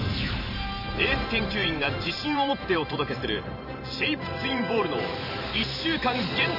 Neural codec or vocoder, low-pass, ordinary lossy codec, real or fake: vocoder, 44.1 kHz, 128 mel bands every 512 samples, BigVGAN v2; 5.4 kHz; none; fake